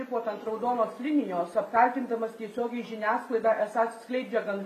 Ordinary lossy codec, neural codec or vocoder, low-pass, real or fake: MP3, 64 kbps; none; 14.4 kHz; real